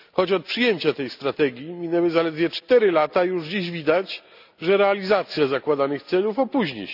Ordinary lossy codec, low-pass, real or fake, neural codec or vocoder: none; 5.4 kHz; real; none